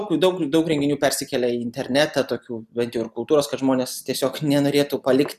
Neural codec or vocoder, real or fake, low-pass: none; real; 14.4 kHz